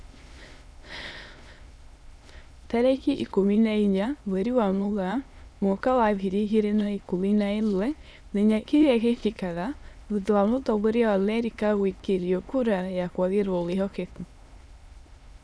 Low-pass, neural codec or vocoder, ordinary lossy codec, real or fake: none; autoencoder, 22.05 kHz, a latent of 192 numbers a frame, VITS, trained on many speakers; none; fake